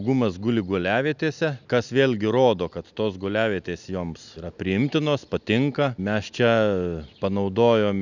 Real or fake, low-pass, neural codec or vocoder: real; 7.2 kHz; none